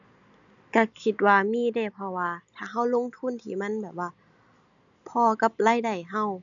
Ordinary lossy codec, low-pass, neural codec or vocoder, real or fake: none; 7.2 kHz; none; real